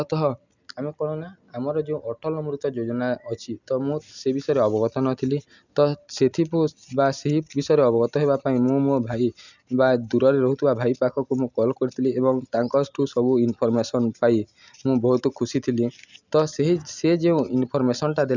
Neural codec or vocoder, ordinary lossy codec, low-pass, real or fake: none; none; 7.2 kHz; real